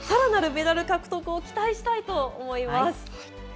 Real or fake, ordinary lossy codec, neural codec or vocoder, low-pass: real; none; none; none